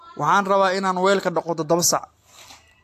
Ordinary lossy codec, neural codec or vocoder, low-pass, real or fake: AAC, 64 kbps; none; 14.4 kHz; real